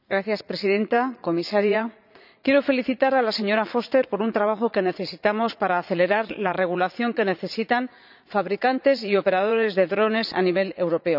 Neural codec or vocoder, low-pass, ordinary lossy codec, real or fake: vocoder, 44.1 kHz, 80 mel bands, Vocos; 5.4 kHz; none; fake